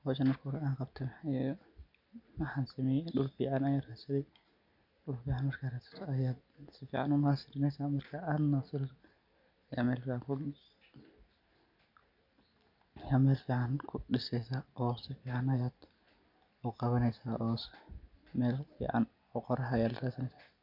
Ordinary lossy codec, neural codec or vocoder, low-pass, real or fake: none; none; 5.4 kHz; real